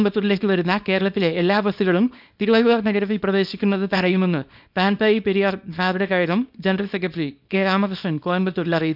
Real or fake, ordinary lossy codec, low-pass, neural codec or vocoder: fake; none; 5.4 kHz; codec, 24 kHz, 0.9 kbps, WavTokenizer, small release